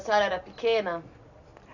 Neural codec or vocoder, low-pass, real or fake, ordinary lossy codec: none; 7.2 kHz; real; none